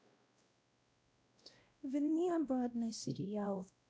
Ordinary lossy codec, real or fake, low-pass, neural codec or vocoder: none; fake; none; codec, 16 kHz, 0.5 kbps, X-Codec, WavLM features, trained on Multilingual LibriSpeech